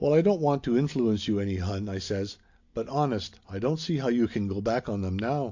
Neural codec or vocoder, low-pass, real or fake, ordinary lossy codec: none; 7.2 kHz; real; AAC, 48 kbps